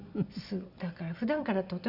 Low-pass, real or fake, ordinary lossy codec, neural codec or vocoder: 5.4 kHz; real; none; none